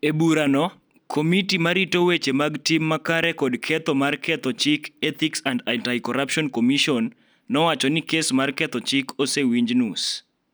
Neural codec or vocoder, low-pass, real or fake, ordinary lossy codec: none; none; real; none